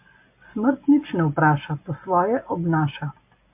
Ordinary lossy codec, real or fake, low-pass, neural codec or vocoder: MP3, 32 kbps; real; 3.6 kHz; none